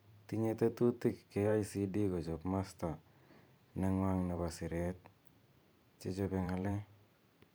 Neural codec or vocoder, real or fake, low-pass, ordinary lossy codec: vocoder, 44.1 kHz, 128 mel bands every 512 samples, BigVGAN v2; fake; none; none